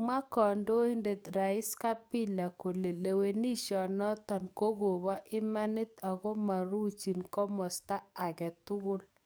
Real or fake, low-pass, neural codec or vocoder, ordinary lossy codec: fake; none; codec, 44.1 kHz, 7.8 kbps, DAC; none